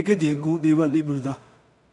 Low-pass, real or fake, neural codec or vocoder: 10.8 kHz; fake; codec, 16 kHz in and 24 kHz out, 0.4 kbps, LongCat-Audio-Codec, two codebook decoder